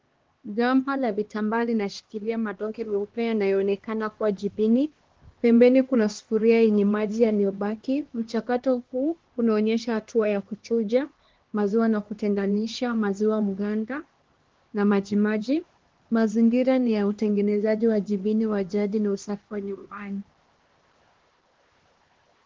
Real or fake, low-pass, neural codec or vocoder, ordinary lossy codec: fake; 7.2 kHz; codec, 16 kHz, 2 kbps, X-Codec, HuBERT features, trained on LibriSpeech; Opus, 16 kbps